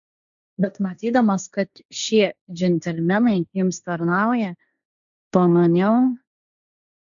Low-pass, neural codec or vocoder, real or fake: 7.2 kHz; codec, 16 kHz, 1.1 kbps, Voila-Tokenizer; fake